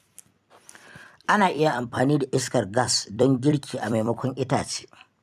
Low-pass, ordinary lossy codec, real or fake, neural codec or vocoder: 14.4 kHz; none; fake; vocoder, 44.1 kHz, 128 mel bands every 256 samples, BigVGAN v2